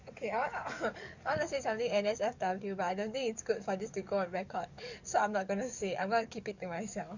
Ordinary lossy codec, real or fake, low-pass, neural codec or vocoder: Opus, 64 kbps; fake; 7.2 kHz; codec, 44.1 kHz, 7.8 kbps, DAC